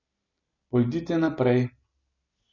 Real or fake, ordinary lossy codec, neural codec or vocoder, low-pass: real; none; none; 7.2 kHz